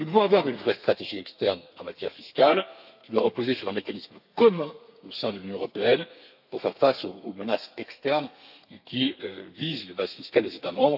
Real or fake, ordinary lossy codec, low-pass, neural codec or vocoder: fake; AAC, 48 kbps; 5.4 kHz; codec, 32 kHz, 1.9 kbps, SNAC